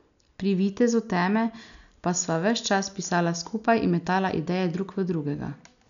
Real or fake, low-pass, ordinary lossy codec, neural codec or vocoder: real; 7.2 kHz; none; none